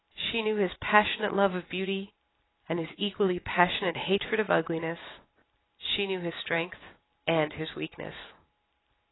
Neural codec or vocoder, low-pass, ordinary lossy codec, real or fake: none; 7.2 kHz; AAC, 16 kbps; real